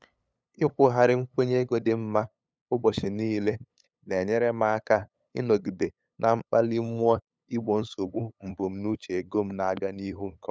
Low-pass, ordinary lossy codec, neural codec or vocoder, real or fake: none; none; codec, 16 kHz, 8 kbps, FunCodec, trained on LibriTTS, 25 frames a second; fake